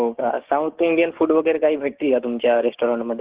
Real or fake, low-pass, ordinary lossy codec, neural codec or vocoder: fake; 3.6 kHz; Opus, 16 kbps; codec, 16 kHz, 6 kbps, DAC